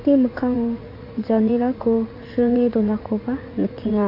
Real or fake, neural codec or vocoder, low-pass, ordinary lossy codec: fake; vocoder, 44.1 kHz, 80 mel bands, Vocos; 5.4 kHz; AAC, 32 kbps